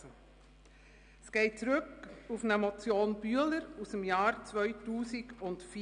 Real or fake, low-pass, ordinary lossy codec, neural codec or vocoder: real; 9.9 kHz; none; none